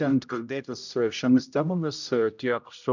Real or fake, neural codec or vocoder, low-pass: fake; codec, 16 kHz, 0.5 kbps, X-Codec, HuBERT features, trained on general audio; 7.2 kHz